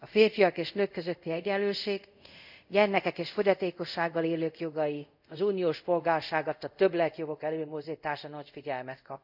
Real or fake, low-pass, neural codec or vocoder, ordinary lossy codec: fake; 5.4 kHz; codec, 24 kHz, 0.5 kbps, DualCodec; none